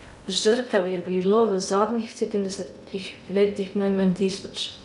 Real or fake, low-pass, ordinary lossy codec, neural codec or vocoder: fake; 10.8 kHz; none; codec, 16 kHz in and 24 kHz out, 0.6 kbps, FocalCodec, streaming, 2048 codes